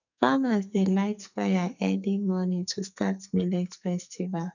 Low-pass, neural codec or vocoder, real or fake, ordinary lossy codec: 7.2 kHz; codec, 44.1 kHz, 2.6 kbps, SNAC; fake; none